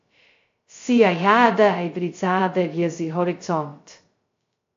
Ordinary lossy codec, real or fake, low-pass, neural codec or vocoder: AAC, 48 kbps; fake; 7.2 kHz; codec, 16 kHz, 0.2 kbps, FocalCodec